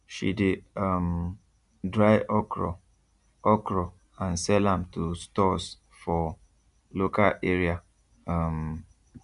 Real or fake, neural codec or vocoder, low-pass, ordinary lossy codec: real; none; 10.8 kHz; MP3, 96 kbps